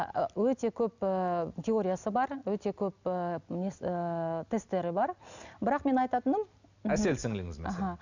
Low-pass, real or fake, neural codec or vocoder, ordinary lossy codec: 7.2 kHz; real; none; none